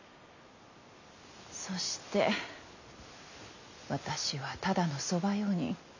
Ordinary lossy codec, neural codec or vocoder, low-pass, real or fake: none; none; 7.2 kHz; real